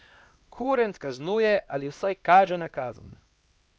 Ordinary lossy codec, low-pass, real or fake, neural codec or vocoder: none; none; fake; codec, 16 kHz, 1 kbps, X-Codec, HuBERT features, trained on LibriSpeech